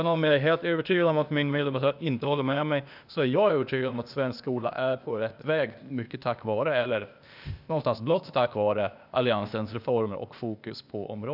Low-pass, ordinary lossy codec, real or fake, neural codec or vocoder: 5.4 kHz; none; fake; codec, 16 kHz, 0.8 kbps, ZipCodec